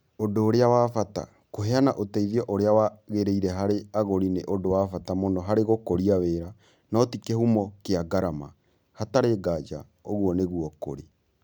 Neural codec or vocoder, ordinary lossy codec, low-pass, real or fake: none; none; none; real